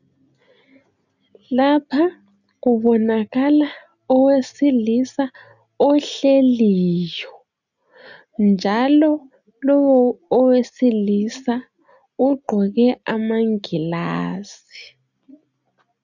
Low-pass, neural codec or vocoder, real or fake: 7.2 kHz; none; real